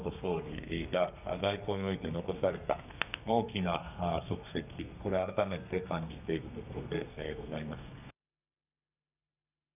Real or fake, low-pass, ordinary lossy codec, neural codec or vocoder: fake; 3.6 kHz; none; codec, 32 kHz, 1.9 kbps, SNAC